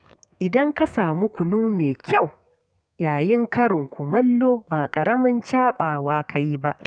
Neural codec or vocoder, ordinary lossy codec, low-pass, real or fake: codec, 32 kHz, 1.9 kbps, SNAC; none; 9.9 kHz; fake